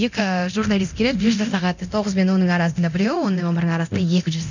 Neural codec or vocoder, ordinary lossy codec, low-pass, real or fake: codec, 24 kHz, 0.9 kbps, DualCodec; none; 7.2 kHz; fake